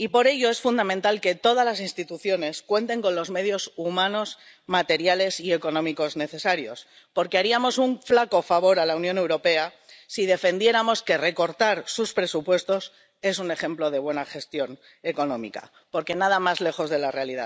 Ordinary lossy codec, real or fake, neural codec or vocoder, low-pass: none; real; none; none